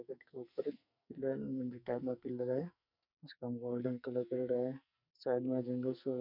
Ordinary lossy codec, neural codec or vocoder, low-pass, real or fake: none; codec, 44.1 kHz, 2.6 kbps, SNAC; 5.4 kHz; fake